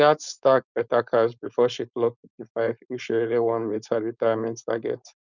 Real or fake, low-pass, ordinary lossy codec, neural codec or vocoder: fake; 7.2 kHz; none; codec, 16 kHz, 4.8 kbps, FACodec